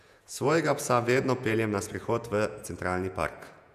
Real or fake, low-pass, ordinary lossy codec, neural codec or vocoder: fake; 14.4 kHz; none; vocoder, 48 kHz, 128 mel bands, Vocos